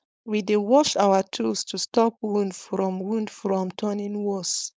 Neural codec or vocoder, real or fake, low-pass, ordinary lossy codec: codec, 16 kHz, 4.8 kbps, FACodec; fake; none; none